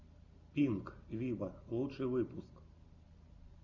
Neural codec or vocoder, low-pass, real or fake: none; 7.2 kHz; real